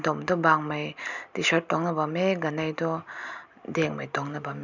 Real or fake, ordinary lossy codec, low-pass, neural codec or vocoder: real; none; 7.2 kHz; none